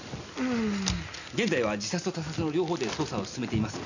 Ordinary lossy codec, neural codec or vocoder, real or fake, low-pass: none; none; real; 7.2 kHz